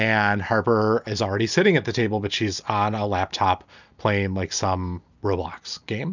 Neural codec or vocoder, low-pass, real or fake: none; 7.2 kHz; real